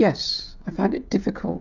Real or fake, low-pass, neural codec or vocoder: fake; 7.2 kHz; codec, 16 kHz, 16 kbps, FreqCodec, smaller model